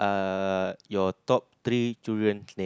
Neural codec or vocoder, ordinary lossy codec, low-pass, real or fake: none; none; none; real